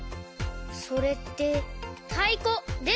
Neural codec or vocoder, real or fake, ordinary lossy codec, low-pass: none; real; none; none